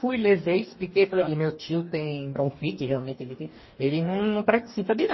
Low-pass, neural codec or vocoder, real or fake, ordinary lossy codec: 7.2 kHz; codec, 44.1 kHz, 2.6 kbps, DAC; fake; MP3, 24 kbps